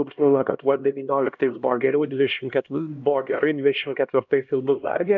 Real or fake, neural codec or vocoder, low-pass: fake; codec, 16 kHz, 1 kbps, X-Codec, HuBERT features, trained on LibriSpeech; 7.2 kHz